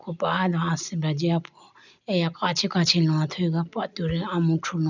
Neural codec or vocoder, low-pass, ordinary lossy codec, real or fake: none; 7.2 kHz; none; real